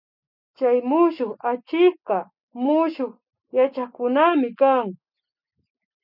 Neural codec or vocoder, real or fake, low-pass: none; real; 5.4 kHz